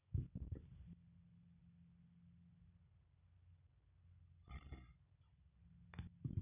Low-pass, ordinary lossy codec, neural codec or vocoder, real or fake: 3.6 kHz; none; none; real